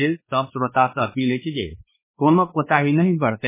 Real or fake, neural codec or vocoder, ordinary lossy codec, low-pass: fake; codec, 16 kHz, 2 kbps, X-Codec, WavLM features, trained on Multilingual LibriSpeech; MP3, 16 kbps; 3.6 kHz